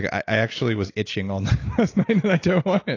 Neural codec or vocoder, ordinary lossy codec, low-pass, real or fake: none; AAC, 32 kbps; 7.2 kHz; real